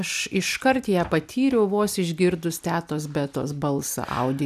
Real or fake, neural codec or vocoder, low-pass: real; none; 14.4 kHz